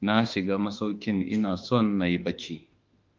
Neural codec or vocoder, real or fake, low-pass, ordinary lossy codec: autoencoder, 48 kHz, 32 numbers a frame, DAC-VAE, trained on Japanese speech; fake; 7.2 kHz; Opus, 24 kbps